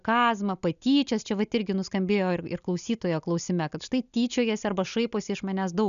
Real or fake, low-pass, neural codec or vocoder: real; 7.2 kHz; none